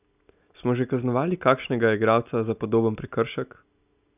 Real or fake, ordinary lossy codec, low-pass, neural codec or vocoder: real; none; 3.6 kHz; none